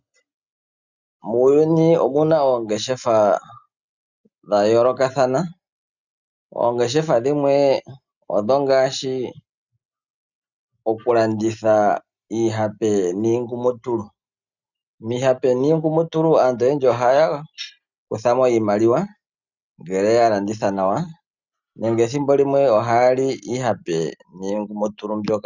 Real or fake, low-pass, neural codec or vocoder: real; 7.2 kHz; none